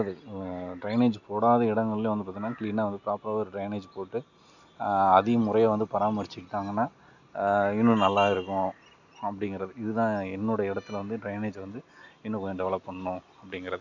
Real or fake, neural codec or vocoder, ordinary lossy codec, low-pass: real; none; none; 7.2 kHz